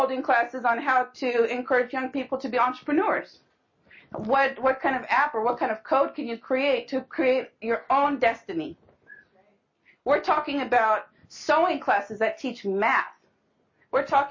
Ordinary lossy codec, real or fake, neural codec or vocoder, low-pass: MP3, 32 kbps; real; none; 7.2 kHz